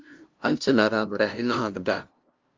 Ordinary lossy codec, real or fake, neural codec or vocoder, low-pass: Opus, 24 kbps; fake; codec, 16 kHz, 0.5 kbps, FunCodec, trained on LibriTTS, 25 frames a second; 7.2 kHz